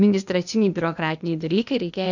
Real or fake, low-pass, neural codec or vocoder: fake; 7.2 kHz; codec, 16 kHz, 0.8 kbps, ZipCodec